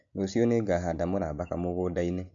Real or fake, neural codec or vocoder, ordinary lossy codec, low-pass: real; none; MP3, 48 kbps; 7.2 kHz